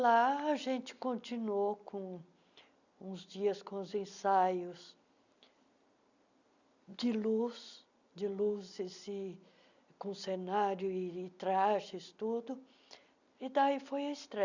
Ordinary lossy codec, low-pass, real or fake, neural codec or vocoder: none; 7.2 kHz; real; none